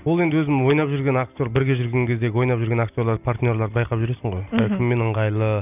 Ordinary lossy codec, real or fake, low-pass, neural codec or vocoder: none; real; 3.6 kHz; none